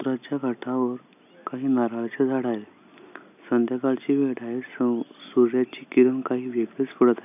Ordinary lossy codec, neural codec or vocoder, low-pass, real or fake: none; none; 3.6 kHz; real